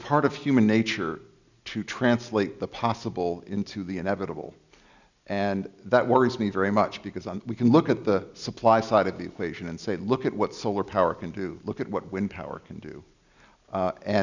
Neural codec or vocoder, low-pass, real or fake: none; 7.2 kHz; real